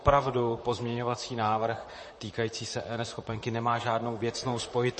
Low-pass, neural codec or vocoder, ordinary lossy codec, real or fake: 10.8 kHz; vocoder, 44.1 kHz, 128 mel bands, Pupu-Vocoder; MP3, 32 kbps; fake